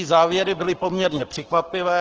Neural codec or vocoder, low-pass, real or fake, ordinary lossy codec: codec, 44.1 kHz, 7.8 kbps, Pupu-Codec; 7.2 kHz; fake; Opus, 16 kbps